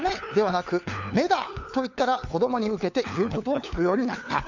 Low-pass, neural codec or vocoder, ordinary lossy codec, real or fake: 7.2 kHz; codec, 16 kHz, 4 kbps, FunCodec, trained on LibriTTS, 50 frames a second; none; fake